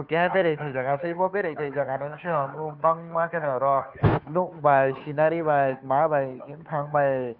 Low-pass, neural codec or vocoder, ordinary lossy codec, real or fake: 5.4 kHz; codec, 16 kHz, 2 kbps, FunCodec, trained on Chinese and English, 25 frames a second; none; fake